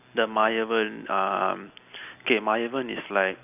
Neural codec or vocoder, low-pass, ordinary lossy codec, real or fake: none; 3.6 kHz; none; real